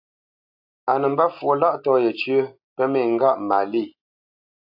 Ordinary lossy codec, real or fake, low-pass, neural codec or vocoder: AAC, 48 kbps; real; 5.4 kHz; none